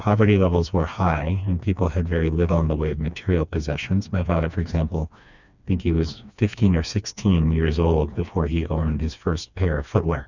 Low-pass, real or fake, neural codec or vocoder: 7.2 kHz; fake; codec, 16 kHz, 2 kbps, FreqCodec, smaller model